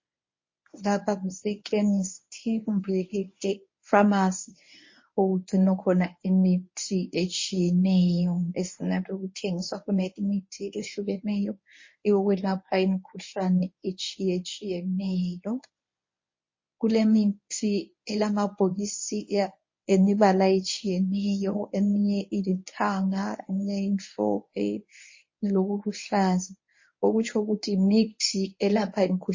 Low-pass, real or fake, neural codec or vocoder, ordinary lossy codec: 7.2 kHz; fake; codec, 24 kHz, 0.9 kbps, WavTokenizer, medium speech release version 1; MP3, 32 kbps